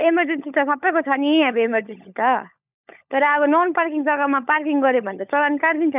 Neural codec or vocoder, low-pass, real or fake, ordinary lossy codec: codec, 16 kHz, 16 kbps, FunCodec, trained on LibriTTS, 50 frames a second; 3.6 kHz; fake; none